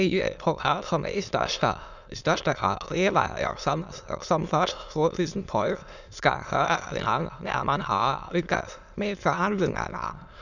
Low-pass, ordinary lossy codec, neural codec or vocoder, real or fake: 7.2 kHz; none; autoencoder, 22.05 kHz, a latent of 192 numbers a frame, VITS, trained on many speakers; fake